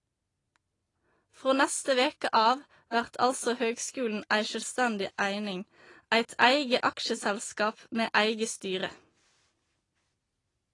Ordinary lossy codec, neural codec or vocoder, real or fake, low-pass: AAC, 32 kbps; none; real; 10.8 kHz